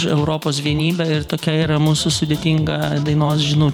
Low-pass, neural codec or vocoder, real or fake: 19.8 kHz; vocoder, 44.1 kHz, 128 mel bands every 512 samples, BigVGAN v2; fake